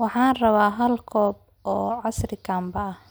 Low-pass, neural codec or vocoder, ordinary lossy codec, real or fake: none; none; none; real